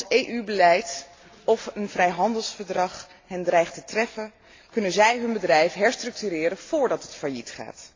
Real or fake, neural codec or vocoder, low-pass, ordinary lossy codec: real; none; 7.2 kHz; AAC, 32 kbps